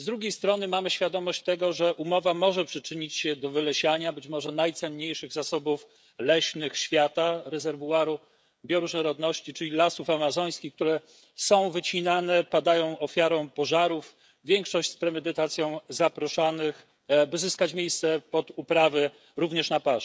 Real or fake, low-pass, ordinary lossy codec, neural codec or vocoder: fake; none; none; codec, 16 kHz, 16 kbps, FreqCodec, smaller model